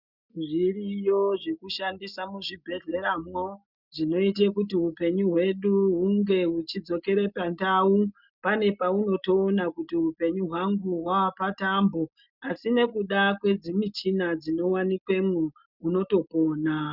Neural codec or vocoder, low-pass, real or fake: none; 5.4 kHz; real